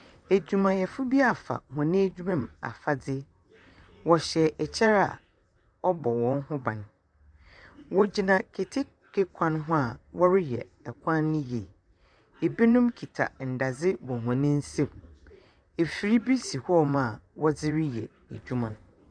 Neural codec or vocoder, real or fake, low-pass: vocoder, 44.1 kHz, 128 mel bands, Pupu-Vocoder; fake; 9.9 kHz